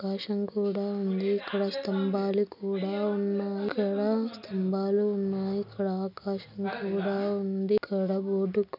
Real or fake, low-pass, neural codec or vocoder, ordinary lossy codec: real; 5.4 kHz; none; none